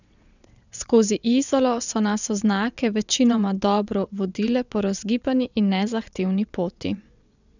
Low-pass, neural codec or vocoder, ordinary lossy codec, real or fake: 7.2 kHz; vocoder, 22.05 kHz, 80 mel bands, WaveNeXt; none; fake